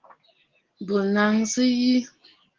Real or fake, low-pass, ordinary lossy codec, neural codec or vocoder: real; 7.2 kHz; Opus, 16 kbps; none